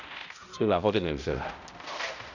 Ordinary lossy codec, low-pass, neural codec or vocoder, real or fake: none; 7.2 kHz; codec, 16 kHz, 0.5 kbps, X-Codec, HuBERT features, trained on balanced general audio; fake